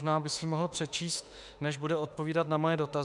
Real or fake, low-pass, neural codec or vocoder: fake; 10.8 kHz; autoencoder, 48 kHz, 32 numbers a frame, DAC-VAE, trained on Japanese speech